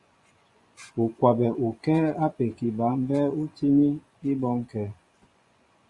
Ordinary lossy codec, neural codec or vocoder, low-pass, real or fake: Opus, 64 kbps; vocoder, 24 kHz, 100 mel bands, Vocos; 10.8 kHz; fake